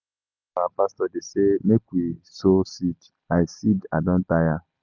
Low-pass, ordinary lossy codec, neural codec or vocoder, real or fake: 7.2 kHz; none; none; real